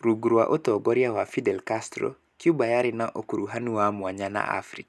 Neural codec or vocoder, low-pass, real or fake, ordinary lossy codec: none; none; real; none